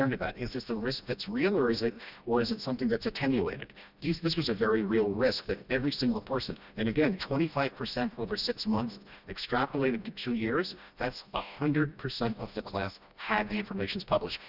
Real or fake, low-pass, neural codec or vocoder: fake; 5.4 kHz; codec, 16 kHz, 1 kbps, FreqCodec, smaller model